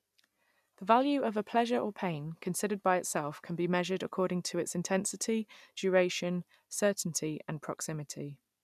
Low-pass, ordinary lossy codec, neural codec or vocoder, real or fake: 14.4 kHz; none; none; real